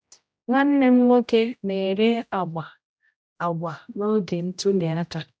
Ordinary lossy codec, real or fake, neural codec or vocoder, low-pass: none; fake; codec, 16 kHz, 0.5 kbps, X-Codec, HuBERT features, trained on general audio; none